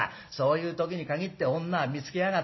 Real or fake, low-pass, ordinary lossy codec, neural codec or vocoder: real; 7.2 kHz; MP3, 24 kbps; none